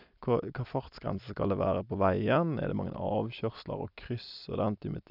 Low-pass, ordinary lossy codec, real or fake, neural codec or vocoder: 5.4 kHz; none; real; none